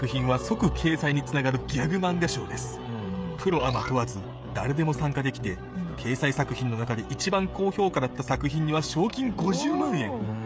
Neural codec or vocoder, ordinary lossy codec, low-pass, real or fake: codec, 16 kHz, 16 kbps, FreqCodec, smaller model; none; none; fake